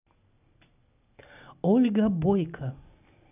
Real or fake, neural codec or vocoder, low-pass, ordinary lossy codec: real; none; 3.6 kHz; none